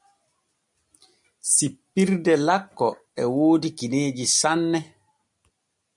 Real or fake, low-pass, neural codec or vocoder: real; 10.8 kHz; none